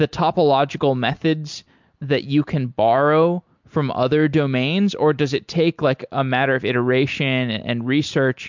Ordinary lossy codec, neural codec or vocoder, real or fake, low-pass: MP3, 64 kbps; none; real; 7.2 kHz